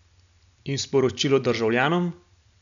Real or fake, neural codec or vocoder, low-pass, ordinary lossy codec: real; none; 7.2 kHz; none